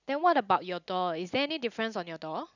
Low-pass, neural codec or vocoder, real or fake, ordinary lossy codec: 7.2 kHz; none; real; none